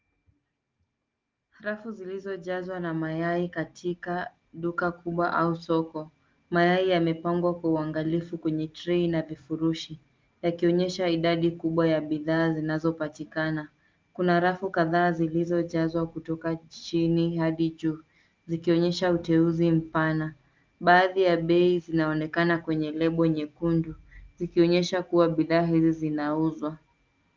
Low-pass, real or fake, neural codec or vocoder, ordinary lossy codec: 7.2 kHz; real; none; Opus, 24 kbps